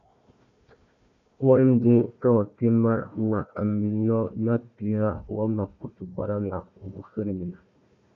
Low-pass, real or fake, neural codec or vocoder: 7.2 kHz; fake; codec, 16 kHz, 1 kbps, FunCodec, trained on Chinese and English, 50 frames a second